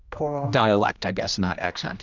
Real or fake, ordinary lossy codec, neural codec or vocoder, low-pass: fake; Opus, 64 kbps; codec, 16 kHz, 1 kbps, X-Codec, HuBERT features, trained on general audio; 7.2 kHz